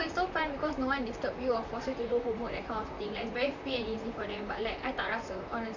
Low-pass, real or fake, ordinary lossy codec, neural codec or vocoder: 7.2 kHz; fake; none; vocoder, 44.1 kHz, 128 mel bands, Pupu-Vocoder